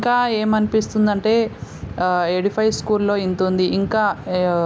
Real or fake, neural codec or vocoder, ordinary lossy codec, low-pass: real; none; none; none